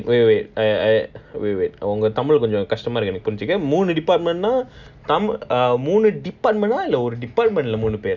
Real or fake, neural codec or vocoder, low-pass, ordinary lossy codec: real; none; 7.2 kHz; none